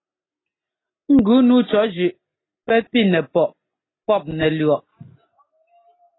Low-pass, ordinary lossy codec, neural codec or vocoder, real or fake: 7.2 kHz; AAC, 16 kbps; none; real